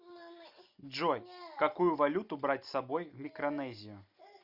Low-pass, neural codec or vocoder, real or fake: 5.4 kHz; none; real